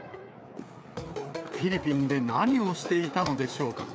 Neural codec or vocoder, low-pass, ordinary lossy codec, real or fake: codec, 16 kHz, 4 kbps, FreqCodec, larger model; none; none; fake